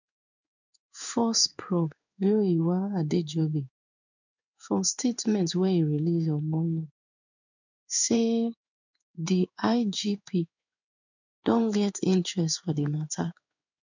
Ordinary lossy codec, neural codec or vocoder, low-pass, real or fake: none; codec, 16 kHz in and 24 kHz out, 1 kbps, XY-Tokenizer; 7.2 kHz; fake